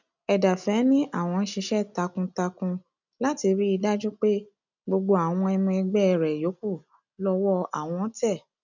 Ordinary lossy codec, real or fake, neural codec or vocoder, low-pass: none; real; none; 7.2 kHz